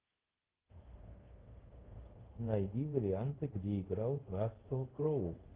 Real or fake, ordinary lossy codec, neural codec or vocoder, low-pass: fake; Opus, 16 kbps; codec, 24 kHz, 0.5 kbps, DualCodec; 3.6 kHz